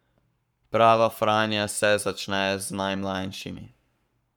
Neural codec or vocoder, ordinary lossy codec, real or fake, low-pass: codec, 44.1 kHz, 7.8 kbps, Pupu-Codec; none; fake; 19.8 kHz